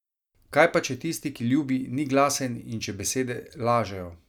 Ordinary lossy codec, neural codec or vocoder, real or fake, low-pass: none; none; real; 19.8 kHz